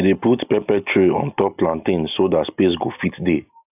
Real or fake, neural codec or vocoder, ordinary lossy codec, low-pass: real; none; AAC, 32 kbps; 3.6 kHz